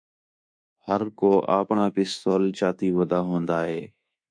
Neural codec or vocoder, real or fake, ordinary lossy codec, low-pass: codec, 24 kHz, 1.2 kbps, DualCodec; fake; MP3, 64 kbps; 9.9 kHz